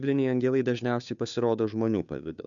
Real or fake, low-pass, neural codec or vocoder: fake; 7.2 kHz; codec, 16 kHz, 2 kbps, FunCodec, trained on Chinese and English, 25 frames a second